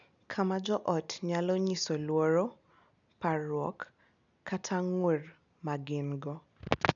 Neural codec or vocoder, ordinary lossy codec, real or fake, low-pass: none; none; real; 7.2 kHz